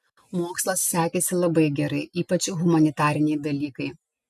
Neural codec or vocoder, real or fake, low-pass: none; real; 14.4 kHz